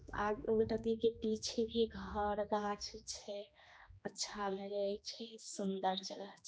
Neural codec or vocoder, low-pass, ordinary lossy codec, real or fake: codec, 16 kHz, 2 kbps, X-Codec, HuBERT features, trained on general audio; none; none; fake